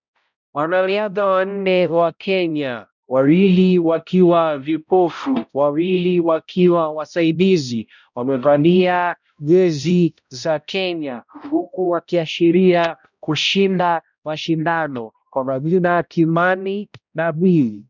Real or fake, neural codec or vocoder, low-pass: fake; codec, 16 kHz, 0.5 kbps, X-Codec, HuBERT features, trained on balanced general audio; 7.2 kHz